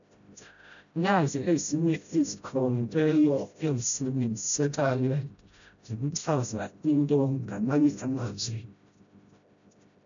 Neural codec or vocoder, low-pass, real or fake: codec, 16 kHz, 0.5 kbps, FreqCodec, smaller model; 7.2 kHz; fake